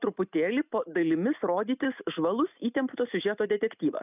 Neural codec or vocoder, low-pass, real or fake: none; 3.6 kHz; real